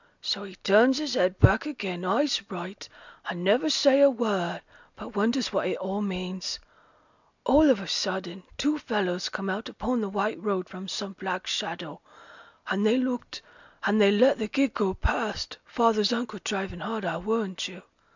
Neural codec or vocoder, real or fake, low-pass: none; real; 7.2 kHz